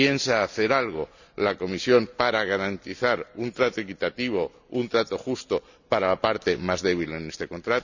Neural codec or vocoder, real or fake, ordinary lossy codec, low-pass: none; real; none; 7.2 kHz